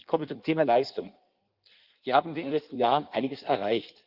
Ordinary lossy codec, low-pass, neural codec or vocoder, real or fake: Opus, 24 kbps; 5.4 kHz; codec, 16 kHz in and 24 kHz out, 1.1 kbps, FireRedTTS-2 codec; fake